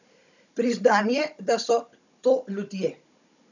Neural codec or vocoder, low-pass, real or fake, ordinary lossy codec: codec, 16 kHz, 16 kbps, FunCodec, trained on Chinese and English, 50 frames a second; 7.2 kHz; fake; none